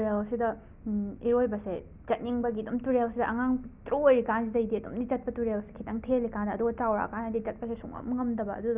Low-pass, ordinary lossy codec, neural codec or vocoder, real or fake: 3.6 kHz; Opus, 64 kbps; none; real